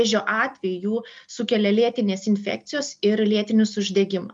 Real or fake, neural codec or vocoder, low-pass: real; none; 9.9 kHz